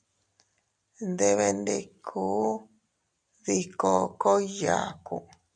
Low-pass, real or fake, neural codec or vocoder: 9.9 kHz; real; none